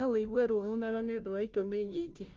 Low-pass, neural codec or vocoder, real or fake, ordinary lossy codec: 7.2 kHz; codec, 16 kHz, 0.5 kbps, FunCodec, trained on Chinese and English, 25 frames a second; fake; Opus, 32 kbps